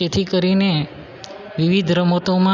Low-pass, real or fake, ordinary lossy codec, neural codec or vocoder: 7.2 kHz; real; none; none